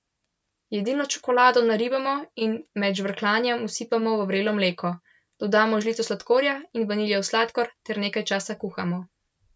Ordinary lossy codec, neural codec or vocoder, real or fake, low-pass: none; none; real; none